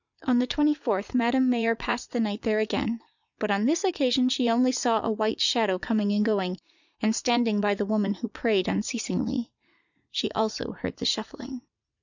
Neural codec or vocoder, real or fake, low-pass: vocoder, 44.1 kHz, 80 mel bands, Vocos; fake; 7.2 kHz